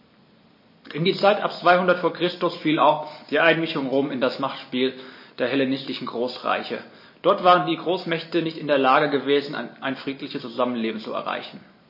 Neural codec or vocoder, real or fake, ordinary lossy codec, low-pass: none; real; MP3, 24 kbps; 5.4 kHz